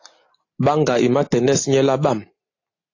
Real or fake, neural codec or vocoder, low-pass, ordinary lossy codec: real; none; 7.2 kHz; AAC, 32 kbps